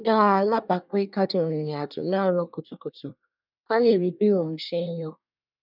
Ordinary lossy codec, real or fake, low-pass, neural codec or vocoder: none; fake; 5.4 kHz; codec, 24 kHz, 1 kbps, SNAC